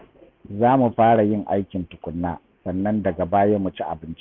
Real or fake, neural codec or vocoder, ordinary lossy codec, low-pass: real; none; none; 7.2 kHz